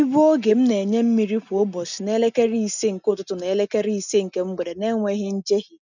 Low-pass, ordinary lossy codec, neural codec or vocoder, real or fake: 7.2 kHz; none; none; real